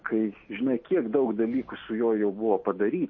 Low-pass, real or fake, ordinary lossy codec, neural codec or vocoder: 7.2 kHz; real; MP3, 32 kbps; none